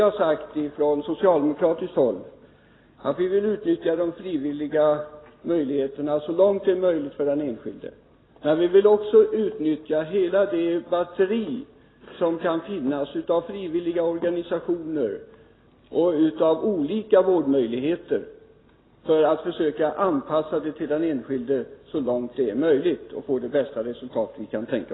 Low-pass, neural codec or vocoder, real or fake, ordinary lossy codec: 7.2 kHz; none; real; AAC, 16 kbps